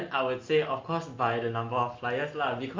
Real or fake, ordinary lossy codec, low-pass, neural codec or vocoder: real; Opus, 24 kbps; 7.2 kHz; none